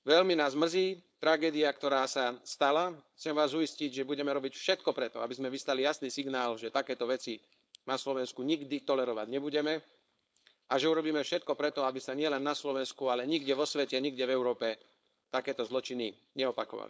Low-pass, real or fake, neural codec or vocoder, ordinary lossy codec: none; fake; codec, 16 kHz, 4.8 kbps, FACodec; none